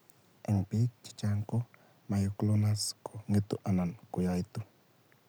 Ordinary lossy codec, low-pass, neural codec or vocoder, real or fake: none; none; none; real